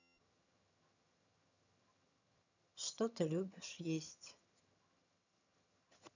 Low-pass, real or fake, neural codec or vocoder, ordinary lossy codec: 7.2 kHz; fake; vocoder, 22.05 kHz, 80 mel bands, HiFi-GAN; none